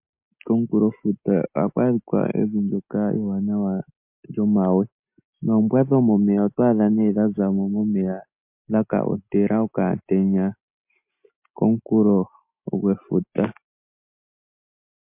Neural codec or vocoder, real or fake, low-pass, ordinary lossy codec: none; real; 3.6 kHz; MP3, 32 kbps